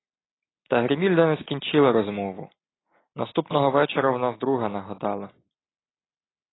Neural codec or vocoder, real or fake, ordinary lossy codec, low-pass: none; real; AAC, 16 kbps; 7.2 kHz